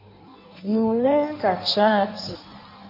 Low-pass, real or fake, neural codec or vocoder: 5.4 kHz; fake; codec, 16 kHz in and 24 kHz out, 1.1 kbps, FireRedTTS-2 codec